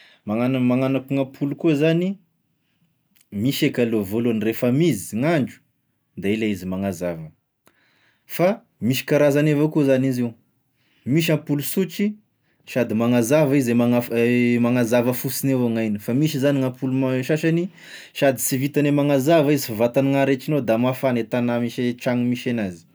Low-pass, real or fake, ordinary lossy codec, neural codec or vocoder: none; real; none; none